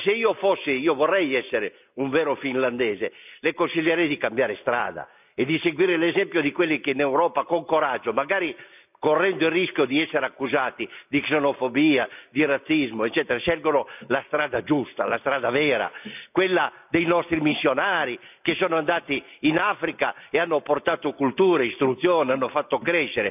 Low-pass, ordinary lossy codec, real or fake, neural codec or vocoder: 3.6 kHz; none; real; none